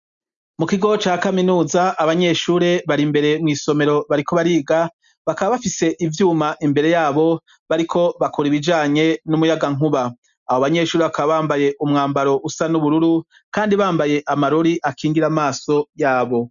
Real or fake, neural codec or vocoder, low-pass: real; none; 7.2 kHz